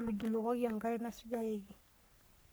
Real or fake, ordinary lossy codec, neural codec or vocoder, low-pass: fake; none; codec, 44.1 kHz, 3.4 kbps, Pupu-Codec; none